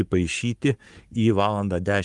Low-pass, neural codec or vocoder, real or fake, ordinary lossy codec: 10.8 kHz; codec, 44.1 kHz, 7.8 kbps, Pupu-Codec; fake; Opus, 32 kbps